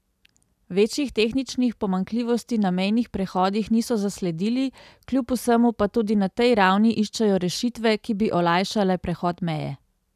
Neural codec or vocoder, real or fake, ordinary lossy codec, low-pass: none; real; none; 14.4 kHz